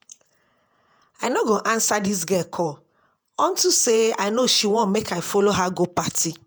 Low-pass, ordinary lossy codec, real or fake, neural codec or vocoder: none; none; fake; vocoder, 48 kHz, 128 mel bands, Vocos